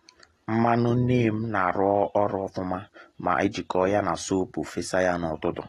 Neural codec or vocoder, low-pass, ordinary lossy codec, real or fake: none; 19.8 kHz; AAC, 32 kbps; real